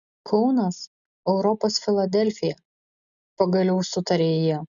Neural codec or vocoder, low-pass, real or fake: none; 7.2 kHz; real